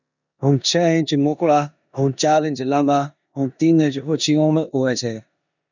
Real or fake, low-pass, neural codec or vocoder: fake; 7.2 kHz; codec, 16 kHz in and 24 kHz out, 0.9 kbps, LongCat-Audio-Codec, four codebook decoder